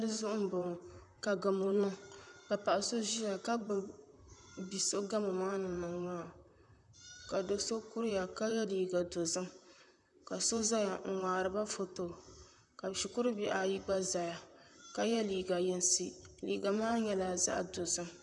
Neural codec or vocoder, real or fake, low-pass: vocoder, 44.1 kHz, 128 mel bands, Pupu-Vocoder; fake; 10.8 kHz